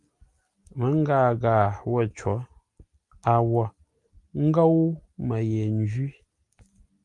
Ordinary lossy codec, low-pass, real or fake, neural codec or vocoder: Opus, 32 kbps; 10.8 kHz; real; none